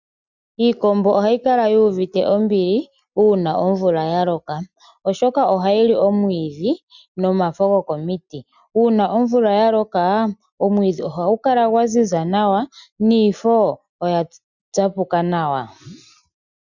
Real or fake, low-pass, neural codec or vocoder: real; 7.2 kHz; none